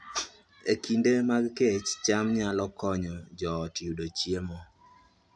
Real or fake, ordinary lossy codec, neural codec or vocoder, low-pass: real; none; none; none